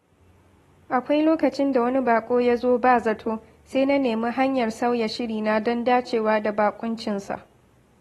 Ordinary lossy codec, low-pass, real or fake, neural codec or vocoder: AAC, 32 kbps; 19.8 kHz; real; none